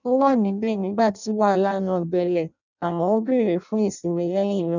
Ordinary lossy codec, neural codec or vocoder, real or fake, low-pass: none; codec, 16 kHz in and 24 kHz out, 0.6 kbps, FireRedTTS-2 codec; fake; 7.2 kHz